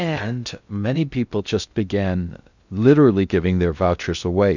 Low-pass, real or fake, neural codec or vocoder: 7.2 kHz; fake; codec, 16 kHz in and 24 kHz out, 0.6 kbps, FocalCodec, streaming, 2048 codes